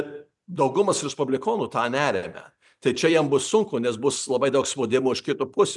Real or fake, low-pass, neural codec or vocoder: fake; 10.8 kHz; vocoder, 44.1 kHz, 128 mel bands every 256 samples, BigVGAN v2